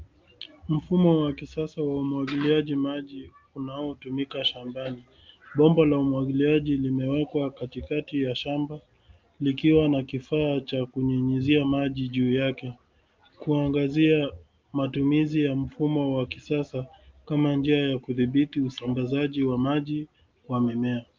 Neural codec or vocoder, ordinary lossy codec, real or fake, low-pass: none; Opus, 24 kbps; real; 7.2 kHz